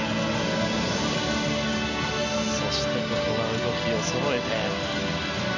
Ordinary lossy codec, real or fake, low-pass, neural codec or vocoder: none; real; 7.2 kHz; none